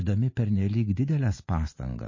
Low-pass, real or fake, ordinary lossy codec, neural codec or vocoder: 7.2 kHz; real; MP3, 32 kbps; none